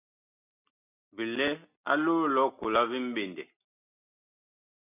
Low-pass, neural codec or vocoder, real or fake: 3.6 kHz; none; real